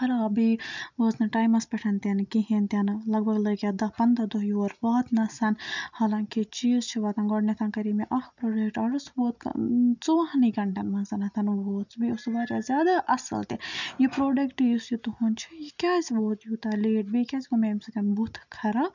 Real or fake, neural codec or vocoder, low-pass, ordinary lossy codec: real; none; 7.2 kHz; none